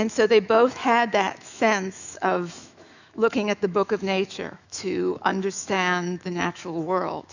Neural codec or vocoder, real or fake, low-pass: autoencoder, 48 kHz, 128 numbers a frame, DAC-VAE, trained on Japanese speech; fake; 7.2 kHz